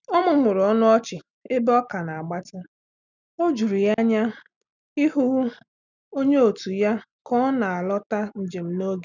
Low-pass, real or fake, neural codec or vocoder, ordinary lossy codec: 7.2 kHz; real; none; none